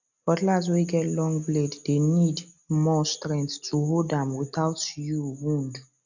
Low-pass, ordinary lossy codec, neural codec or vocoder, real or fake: 7.2 kHz; none; none; real